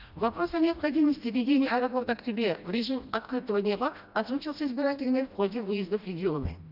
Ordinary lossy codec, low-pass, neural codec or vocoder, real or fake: none; 5.4 kHz; codec, 16 kHz, 1 kbps, FreqCodec, smaller model; fake